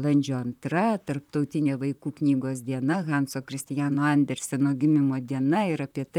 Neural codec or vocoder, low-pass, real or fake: vocoder, 44.1 kHz, 128 mel bands, Pupu-Vocoder; 19.8 kHz; fake